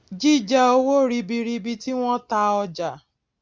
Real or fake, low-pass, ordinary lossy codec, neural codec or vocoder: real; none; none; none